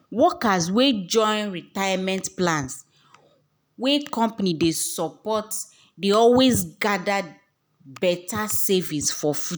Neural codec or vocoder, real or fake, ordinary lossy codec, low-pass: none; real; none; none